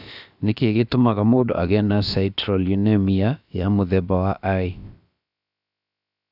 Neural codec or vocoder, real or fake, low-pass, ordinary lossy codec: codec, 16 kHz, about 1 kbps, DyCAST, with the encoder's durations; fake; 5.4 kHz; AAC, 48 kbps